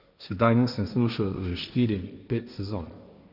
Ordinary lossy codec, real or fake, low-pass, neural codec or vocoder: none; fake; 5.4 kHz; codec, 16 kHz, 1.1 kbps, Voila-Tokenizer